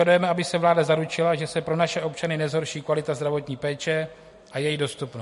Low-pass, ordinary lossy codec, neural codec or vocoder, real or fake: 14.4 kHz; MP3, 48 kbps; vocoder, 44.1 kHz, 128 mel bands every 256 samples, BigVGAN v2; fake